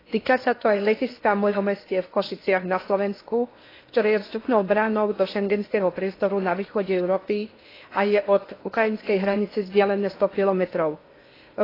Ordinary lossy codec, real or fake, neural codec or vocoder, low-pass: AAC, 24 kbps; fake; codec, 16 kHz in and 24 kHz out, 0.8 kbps, FocalCodec, streaming, 65536 codes; 5.4 kHz